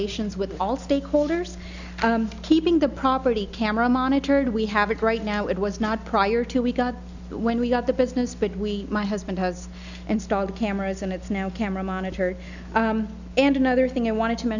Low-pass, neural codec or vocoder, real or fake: 7.2 kHz; none; real